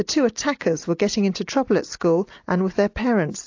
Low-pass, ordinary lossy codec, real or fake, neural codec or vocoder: 7.2 kHz; AAC, 48 kbps; real; none